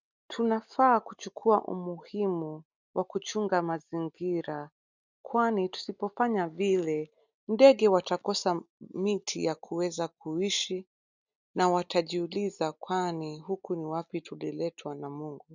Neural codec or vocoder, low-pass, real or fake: none; 7.2 kHz; real